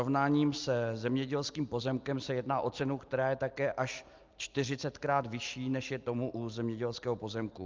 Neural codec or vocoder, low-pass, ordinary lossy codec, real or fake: none; 7.2 kHz; Opus, 24 kbps; real